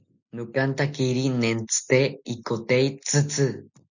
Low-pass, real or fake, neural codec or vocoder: 7.2 kHz; real; none